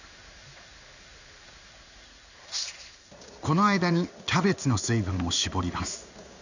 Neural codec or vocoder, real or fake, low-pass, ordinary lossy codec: codec, 16 kHz in and 24 kHz out, 1 kbps, XY-Tokenizer; fake; 7.2 kHz; none